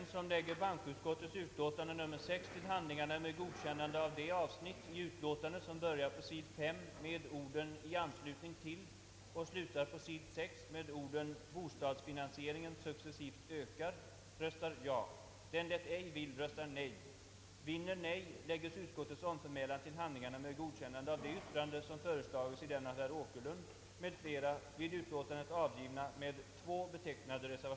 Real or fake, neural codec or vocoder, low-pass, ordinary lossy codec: real; none; none; none